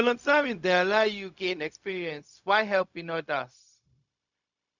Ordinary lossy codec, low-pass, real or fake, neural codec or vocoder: none; 7.2 kHz; fake; codec, 16 kHz, 0.4 kbps, LongCat-Audio-Codec